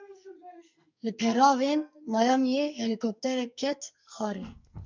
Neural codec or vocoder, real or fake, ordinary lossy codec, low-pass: codec, 44.1 kHz, 2.6 kbps, SNAC; fake; MP3, 64 kbps; 7.2 kHz